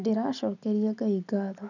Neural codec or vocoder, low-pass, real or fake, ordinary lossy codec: none; 7.2 kHz; real; none